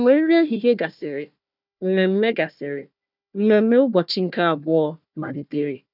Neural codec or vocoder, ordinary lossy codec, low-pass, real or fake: codec, 16 kHz, 1 kbps, FunCodec, trained on Chinese and English, 50 frames a second; none; 5.4 kHz; fake